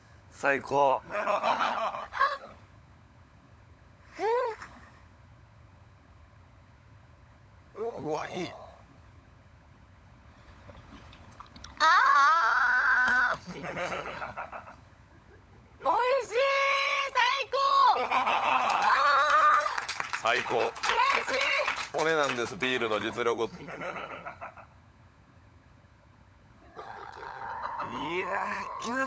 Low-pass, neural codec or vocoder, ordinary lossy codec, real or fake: none; codec, 16 kHz, 16 kbps, FunCodec, trained on LibriTTS, 50 frames a second; none; fake